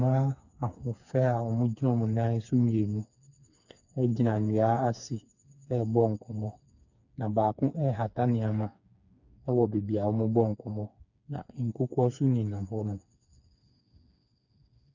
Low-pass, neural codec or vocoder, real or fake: 7.2 kHz; codec, 16 kHz, 4 kbps, FreqCodec, smaller model; fake